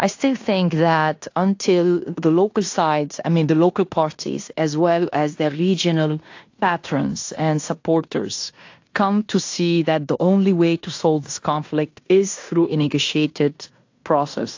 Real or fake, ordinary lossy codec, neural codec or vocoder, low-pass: fake; AAC, 48 kbps; codec, 16 kHz in and 24 kHz out, 0.9 kbps, LongCat-Audio-Codec, fine tuned four codebook decoder; 7.2 kHz